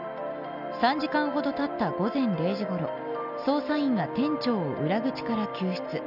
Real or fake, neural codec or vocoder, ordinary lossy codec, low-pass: real; none; none; 5.4 kHz